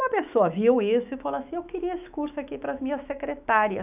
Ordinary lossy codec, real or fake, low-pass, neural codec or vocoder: none; fake; 3.6 kHz; autoencoder, 48 kHz, 128 numbers a frame, DAC-VAE, trained on Japanese speech